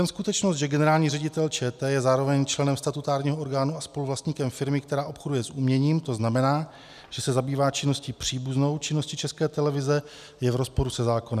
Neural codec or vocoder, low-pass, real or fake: none; 14.4 kHz; real